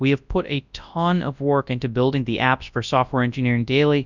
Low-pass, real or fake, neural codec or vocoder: 7.2 kHz; fake; codec, 24 kHz, 0.9 kbps, WavTokenizer, large speech release